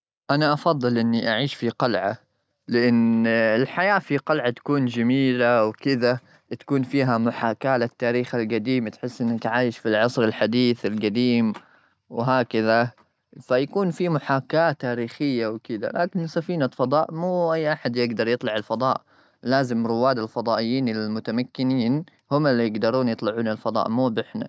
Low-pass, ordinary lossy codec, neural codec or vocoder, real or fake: none; none; none; real